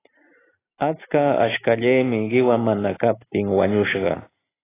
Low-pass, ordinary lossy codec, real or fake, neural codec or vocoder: 3.6 kHz; AAC, 16 kbps; real; none